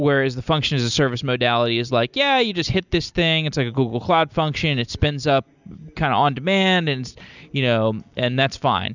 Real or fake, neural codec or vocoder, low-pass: real; none; 7.2 kHz